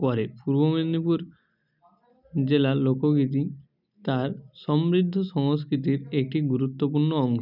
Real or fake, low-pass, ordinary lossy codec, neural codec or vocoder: real; 5.4 kHz; none; none